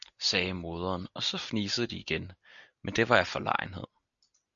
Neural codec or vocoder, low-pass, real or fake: none; 7.2 kHz; real